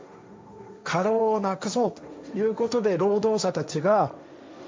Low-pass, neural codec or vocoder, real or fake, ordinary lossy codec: none; codec, 16 kHz, 1.1 kbps, Voila-Tokenizer; fake; none